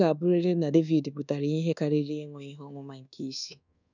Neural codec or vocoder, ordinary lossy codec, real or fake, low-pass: codec, 24 kHz, 1.2 kbps, DualCodec; none; fake; 7.2 kHz